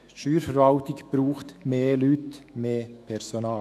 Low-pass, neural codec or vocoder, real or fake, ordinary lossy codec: 14.4 kHz; autoencoder, 48 kHz, 128 numbers a frame, DAC-VAE, trained on Japanese speech; fake; none